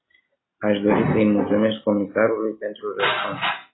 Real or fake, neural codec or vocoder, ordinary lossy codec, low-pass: real; none; AAC, 16 kbps; 7.2 kHz